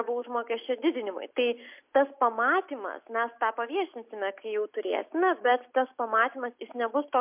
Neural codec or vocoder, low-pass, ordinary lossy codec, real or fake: none; 3.6 kHz; MP3, 32 kbps; real